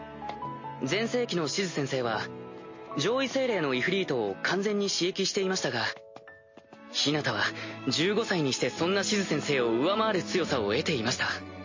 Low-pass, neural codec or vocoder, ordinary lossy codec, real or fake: 7.2 kHz; none; MP3, 32 kbps; real